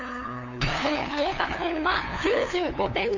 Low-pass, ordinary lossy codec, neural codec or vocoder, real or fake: 7.2 kHz; none; codec, 16 kHz, 2 kbps, FunCodec, trained on LibriTTS, 25 frames a second; fake